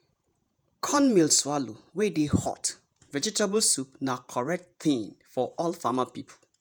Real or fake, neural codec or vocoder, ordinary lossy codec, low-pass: fake; vocoder, 48 kHz, 128 mel bands, Vocos; none; none